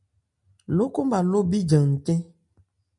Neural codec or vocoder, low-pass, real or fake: none; 10.8 kHz; real